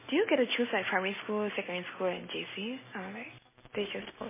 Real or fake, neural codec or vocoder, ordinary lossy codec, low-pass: real; none; MP3, 16 kbps; 3.6 kHz